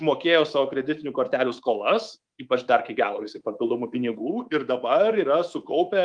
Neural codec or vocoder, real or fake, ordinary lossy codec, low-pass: codec, 24 kHz, 3.1 kbps, DualCodec; fake; Opus, 32 kbps; 9.9 kHz